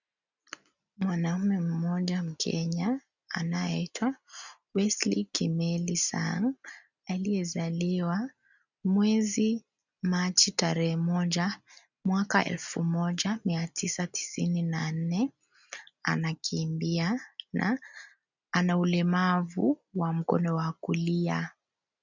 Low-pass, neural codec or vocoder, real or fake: 7.2 kHz; none; real